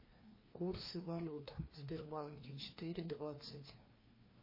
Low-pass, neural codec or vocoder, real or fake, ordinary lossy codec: 5.4 kHz; codec, 16 kHz, 2 kbps, FreqCodec, larger model; fake; MP3, 24 kbps